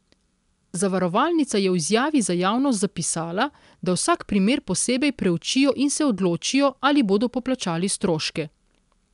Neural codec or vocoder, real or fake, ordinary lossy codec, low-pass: none; real; MP3, 96 kbps; 10.8 kHz